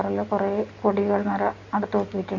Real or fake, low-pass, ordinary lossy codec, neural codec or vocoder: real; 7.2 kHz; AAC, 48 kbps; none